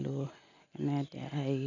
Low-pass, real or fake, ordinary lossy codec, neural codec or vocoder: 7.2 kHz; real; none; none